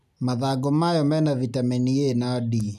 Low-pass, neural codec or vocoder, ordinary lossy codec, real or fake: 14.4 kHz; none; none; real